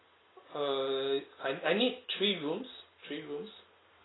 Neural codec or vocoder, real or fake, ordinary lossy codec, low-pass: none; real; AAC, 16 kbps; 7.2 kHz